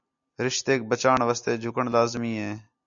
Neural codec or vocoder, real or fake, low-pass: none; real; 7.2 kHz